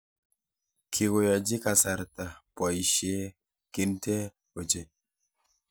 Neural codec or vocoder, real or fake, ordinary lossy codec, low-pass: none; real; none; none